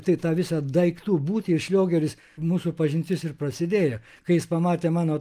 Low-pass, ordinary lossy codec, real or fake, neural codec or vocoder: 14.4 kHz; Opus, 32 kbps; real; none